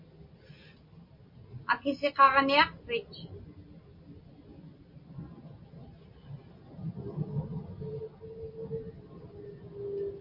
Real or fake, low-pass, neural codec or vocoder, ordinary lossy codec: real; 5.4 kHz; none; MP3, 32 kbps